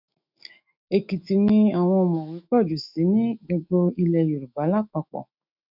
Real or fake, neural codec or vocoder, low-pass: fake; autoencoder, 48 kHz, 128 numbers a frame, DAC-VAE, trained on Japanese speech; 5.4 kHz